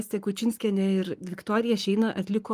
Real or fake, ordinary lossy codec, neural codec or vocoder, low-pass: fake; Opus, 32 kbps; codec, 44.1 kHz, 7.8 kbps, Pupu-Codec; 14.4 kHz